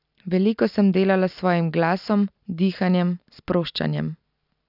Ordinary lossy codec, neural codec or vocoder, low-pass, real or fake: none; none; 5.4 kHz; real